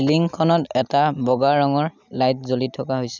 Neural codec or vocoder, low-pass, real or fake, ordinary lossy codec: none; 7.2 kHz; real; none